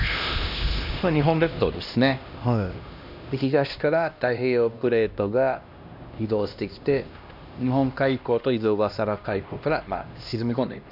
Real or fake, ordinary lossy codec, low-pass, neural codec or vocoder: fake; none; 5.4 kHz; codec, 16 kHz, 1 kbps, X-Codec, HuBERT features, trained on LibriSpeech